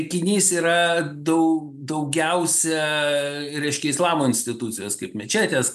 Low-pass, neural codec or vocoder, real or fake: 14.4 kHz; none; real